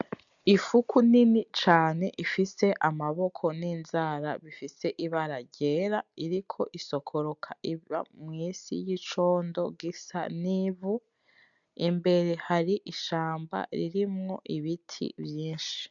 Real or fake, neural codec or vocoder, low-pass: real; none; 7.2 kHz